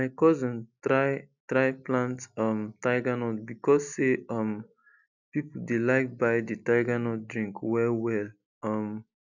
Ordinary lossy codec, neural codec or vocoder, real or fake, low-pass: none; none; real; 7.2 kHz